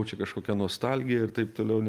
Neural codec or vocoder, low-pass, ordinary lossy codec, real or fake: none; 14.4 kHz; Opus, 32 kbps; real